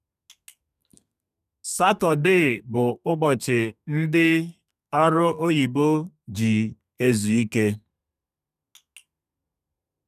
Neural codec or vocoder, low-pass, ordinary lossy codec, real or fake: codec, 32 kHz, 1.9 kbps, SNAC; 14.4 kHz; none; fake